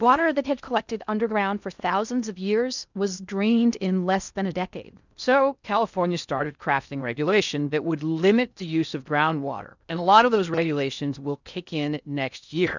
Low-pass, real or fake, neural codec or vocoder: 7.2 kHz; fake; codec, 16 kHz in and 24 kHz out, 0.6 kbps, FocalCodec, streaming, 2048 codes